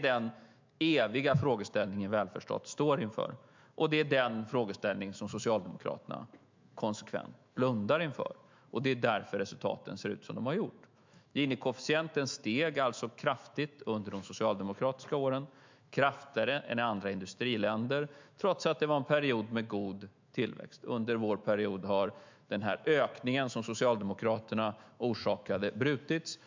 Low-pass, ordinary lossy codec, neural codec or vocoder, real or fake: 7.2 kHz; MP3, 64 kbps; autoencoder, 48 kHz, 128 numbers a frame, DAC-VAE, trained on Japanese speech; fake